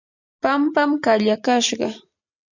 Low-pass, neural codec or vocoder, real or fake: 7.2 kHz; none; real